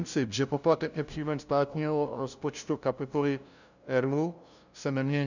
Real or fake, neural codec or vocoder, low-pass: fake; codec, 16 kHz, 0.5 kbps, FunCodec, trained on LibriTTS, 25 frames a second; 7.2 kHz